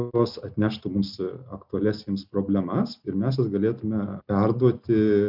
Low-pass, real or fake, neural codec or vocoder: 5.4 kHz; real; none